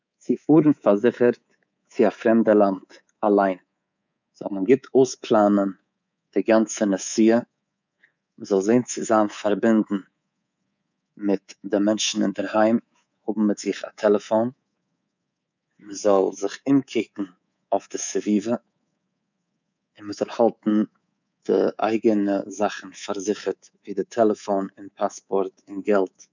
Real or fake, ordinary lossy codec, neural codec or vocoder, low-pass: fake; none; codec, 24 kHz, 3.1 kbps, DualCodec; 7.2 kHz